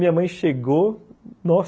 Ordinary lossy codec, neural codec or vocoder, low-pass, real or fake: none; none; none; real